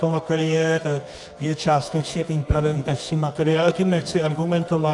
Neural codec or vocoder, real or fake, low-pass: codec, 24 kHz, 0.9 kbps, WavTokenizer, medium music audio release; fake; 10.8 kHz